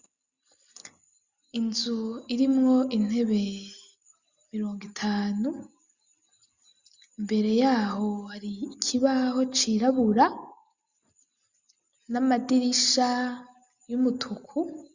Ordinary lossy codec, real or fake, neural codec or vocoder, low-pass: Opus, 64 kbps; real; none; 7.2 kHz